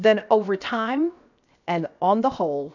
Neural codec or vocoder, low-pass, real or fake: codec, 16 kHz, 0.7 kbps, FocalCodec; 7.2 kHz; fake